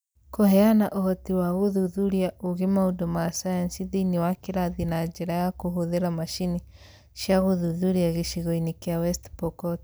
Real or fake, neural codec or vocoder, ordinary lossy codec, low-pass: real; none; none; none